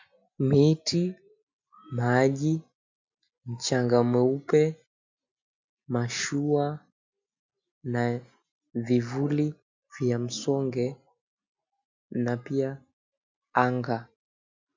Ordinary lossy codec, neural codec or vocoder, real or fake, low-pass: MP3, 64 kbps; none; real; 7.2 kHz